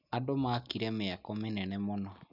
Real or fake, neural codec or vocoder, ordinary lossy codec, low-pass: real; none; none; 5.4 kHz